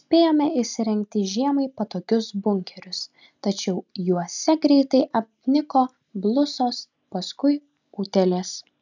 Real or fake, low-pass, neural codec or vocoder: real; 7.2 kHz; none